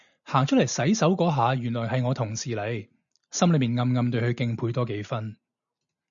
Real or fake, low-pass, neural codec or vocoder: real; 7.2 kHz; none